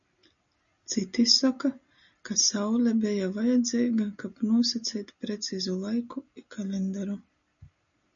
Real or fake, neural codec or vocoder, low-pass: real; none; 7.2 kHz